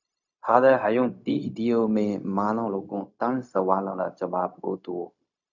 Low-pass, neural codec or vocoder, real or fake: 7.2 kHz; codec, 16 kHz, 0.4 kbps, LongCat-Audio-Codec; fake